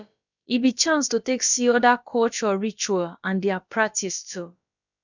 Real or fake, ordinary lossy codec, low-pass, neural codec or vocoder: fake; none; 7.2 kHz; codec, 16 kHz, about 1 kbps, DyCAST, with the encoder's durations